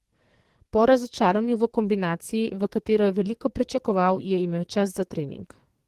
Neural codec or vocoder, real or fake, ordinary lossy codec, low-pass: codec, 44.1 kHz, 2.6 kbps, SNAC; fake; Opus, 16 kbps; 14.4 kHz